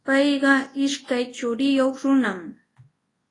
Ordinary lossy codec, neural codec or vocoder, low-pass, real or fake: AAC, 32 kbps; codec, 24 kHz, 0.9 kbps, WavTokenizer, large speech release; 10.8 kHz; fake